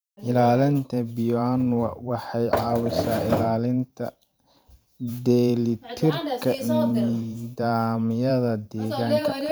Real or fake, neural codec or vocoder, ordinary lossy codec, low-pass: fake; vocoder, 44.1 kHz, 128 mel bands every 512 samples, BigVGAN v2; none; none